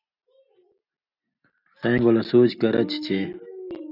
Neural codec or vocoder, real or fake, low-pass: none; real; 5.4 kHz